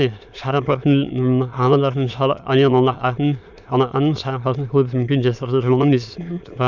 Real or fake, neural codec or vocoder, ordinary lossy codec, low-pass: fake; autoencoder, 22.05 kHz, a latent of 192 numbers a frame, VITS, trained on many speakers; none; 7.2 kHz